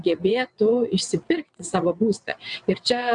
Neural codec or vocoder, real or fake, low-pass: vocoder, 22.05 kHz, 80 mel bands, WaveNeXt; fake; 9.9 kHz